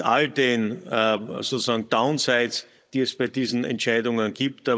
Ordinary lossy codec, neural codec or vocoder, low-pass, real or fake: none; codec, 16 kHz, 16 kbps, FunCodec, trained on Chinese and English, 50 frames a second; none; fake